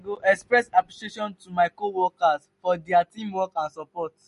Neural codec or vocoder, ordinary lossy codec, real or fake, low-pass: none; MP3, 48 kbps; real; 14.4 kHz